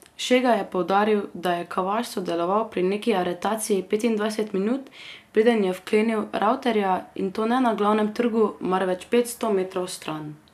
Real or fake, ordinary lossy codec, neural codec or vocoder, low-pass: real; none; none; 14.4 kHz